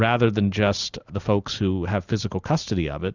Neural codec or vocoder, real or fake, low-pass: none; real; 7.2 kHz